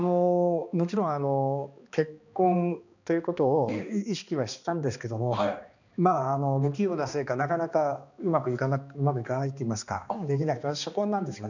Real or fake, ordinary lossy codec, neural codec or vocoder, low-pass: fake; none; codec, 16 kHz, 2 kbps, X-Codec, HuBERT features, trained on balanced general audio; 7.2 kHz